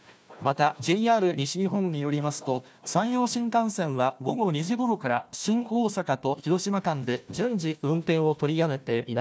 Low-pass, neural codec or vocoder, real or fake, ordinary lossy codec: none; codec, 16 kHz, 1 kbps, FunCodec, trained on Chinese and English, 50 frames a second; fake; none